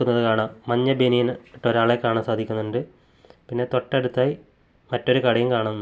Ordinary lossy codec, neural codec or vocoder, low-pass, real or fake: none; none; none; real